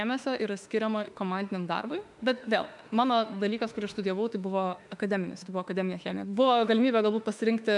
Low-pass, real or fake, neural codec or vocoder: 10.8 kHz; fake; autoencoder, 48 kHz, 32 numbers a frame, DAC-VAE, trained on Japanese speech